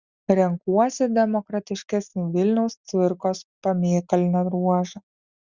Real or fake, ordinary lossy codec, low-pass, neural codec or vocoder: real; Opus, 64 kbps; 7.2 kHz; none